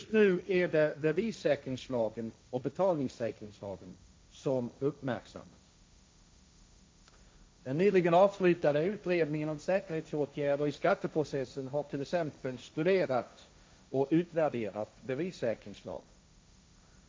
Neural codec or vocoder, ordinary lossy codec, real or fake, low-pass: codec, 16 kHz, 1.1 kbps, Voila-Tokenizer; none; fake; none